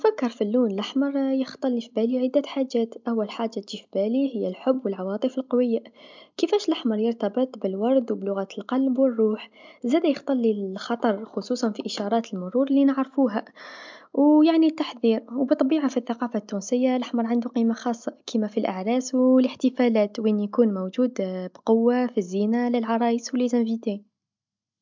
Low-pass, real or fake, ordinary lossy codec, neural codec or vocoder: 7.2 kHz; real; MP3, 64 kbps; none